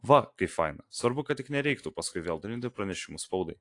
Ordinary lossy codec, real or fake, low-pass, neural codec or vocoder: AAC, 48 kbps; real; 10.8 kHz; none